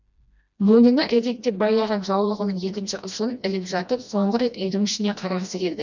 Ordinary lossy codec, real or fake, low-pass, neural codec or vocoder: none; fake; 7.2 kHz; codec, 16 kHz, 1 kbps, FreqCodec, smaller model